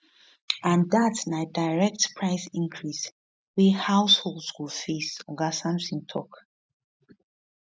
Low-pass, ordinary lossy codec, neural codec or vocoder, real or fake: none; none; none; real